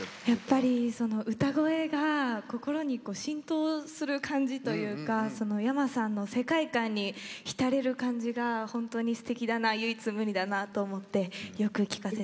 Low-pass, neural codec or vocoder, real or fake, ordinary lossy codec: none; none; real; none